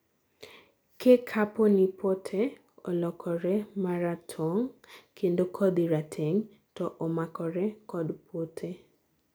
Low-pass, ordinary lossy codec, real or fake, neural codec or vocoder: none; none; real; none